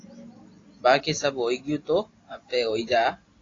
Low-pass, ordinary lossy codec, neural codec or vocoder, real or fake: 7.2 kHz; AAC, 32 kbps; none; real